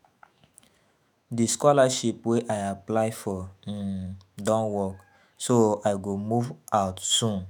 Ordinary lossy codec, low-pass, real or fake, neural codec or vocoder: none; none; fake; autoencoder, 48 kHz, 128 numbers a frame, DAC-VAE, trained on Japanese speech